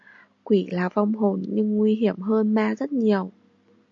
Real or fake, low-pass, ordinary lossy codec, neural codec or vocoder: real; 7.2 kHz; AAC, 48 kbps; none